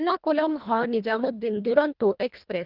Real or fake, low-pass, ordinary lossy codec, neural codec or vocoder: fake; 5.4 kHz; Opus, 32 kbps; codec, 24 kHz, 1.5 kbps, HILCodec